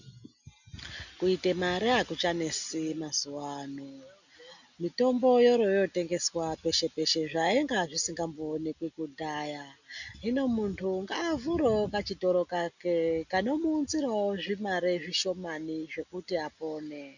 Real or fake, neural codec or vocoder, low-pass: real; none; 7.2 kHz